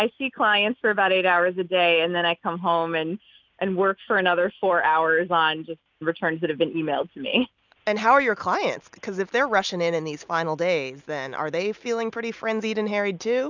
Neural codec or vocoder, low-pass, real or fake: none; 7.2 kHz; real